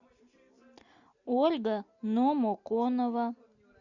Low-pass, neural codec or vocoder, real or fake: 7.2 kHz; none; real